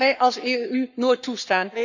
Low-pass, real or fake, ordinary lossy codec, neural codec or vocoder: 7.2 kHz; fake; none; codec, 44.1 kHz, 7.8 kbps, Pupu-Codec